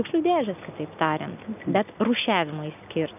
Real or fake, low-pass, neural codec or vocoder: real; 3.6 kHz; none